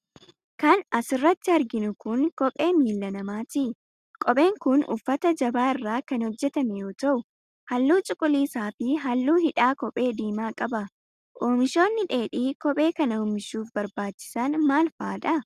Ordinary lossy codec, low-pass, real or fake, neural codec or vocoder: Opus, 64 kbps; 14.4 kHz; real; none